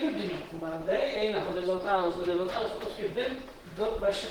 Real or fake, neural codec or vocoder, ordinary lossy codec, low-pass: fake; vocoder, 44.1 kHz, 128 mel bands, Pupu-Vocoder; Opus, 16 kbps; 19.8 kHz